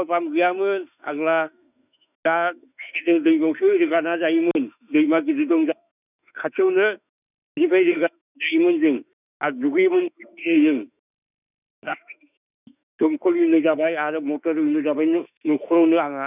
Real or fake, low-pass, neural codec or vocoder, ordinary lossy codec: fake; 3.6 kHz; autoencoder, 48 kHz, 32 numbers a frame, DAC-VAE, trained on Japanese speech; none